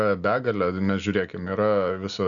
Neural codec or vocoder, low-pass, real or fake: none; 7.2 kHz; real